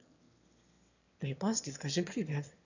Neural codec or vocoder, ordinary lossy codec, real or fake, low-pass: autoencoder, 22.05 kHz, a latent of 192 numbers a frame, VITS, trained on one speaker; none; fake; 7.2 kHz